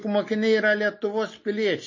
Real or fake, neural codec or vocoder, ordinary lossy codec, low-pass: real; none; MP3, 32 kbps; 7.2 kHz